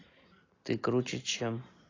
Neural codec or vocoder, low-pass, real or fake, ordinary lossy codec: none; 7.2 kHz; real; AAC, 32 kbps